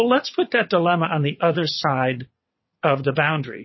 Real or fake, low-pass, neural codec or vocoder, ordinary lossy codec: fake; 7.2 kHz; vocoder, 22.05 kHz, 80 mel bands, WaveNeXt; MP3, 24 kbps